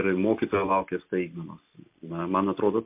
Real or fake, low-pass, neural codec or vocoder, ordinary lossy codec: real; 3.6 kHz; none; MP3, 32 kbps